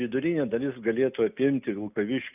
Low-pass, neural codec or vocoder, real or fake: 3.6 kHz; none; real